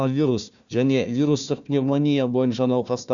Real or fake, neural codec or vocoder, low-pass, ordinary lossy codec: fake; codec, 16 kHz, 1 kbps, FunCodec, trained on Chinese and English, 50 frames a second; 7.2 kHz; none